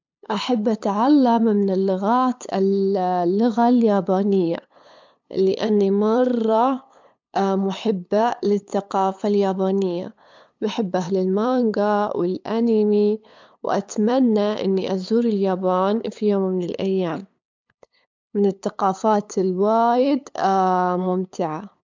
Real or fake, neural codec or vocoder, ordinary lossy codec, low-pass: fake; codec, 16 kHz, 8 kbps, FunCodec, trained on LibriTTS, 25 frames a second; MP3, 64 kbps; 7.2 kHz